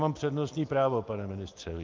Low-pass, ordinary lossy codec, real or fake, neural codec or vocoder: 7.2 kHz; Opus, 24 kbps; real; none